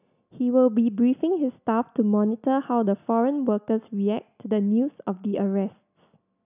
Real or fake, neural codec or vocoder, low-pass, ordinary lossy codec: real; none; 3.6 kHz; none